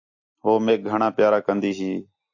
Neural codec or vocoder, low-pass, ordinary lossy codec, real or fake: none; 7.2 kHz; AAC, 48 kbps; real